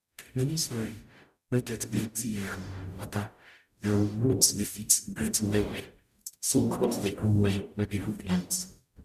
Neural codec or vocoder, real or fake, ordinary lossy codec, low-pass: codec, 44.1 kHz, 0.9 kbps, DAC; fake; none; 14.4 kHz